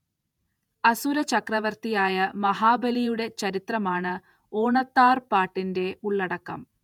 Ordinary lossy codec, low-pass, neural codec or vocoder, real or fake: none; 19.8 kHz; vocoder, 48 kHz, 128 mel bands, Vocos; fake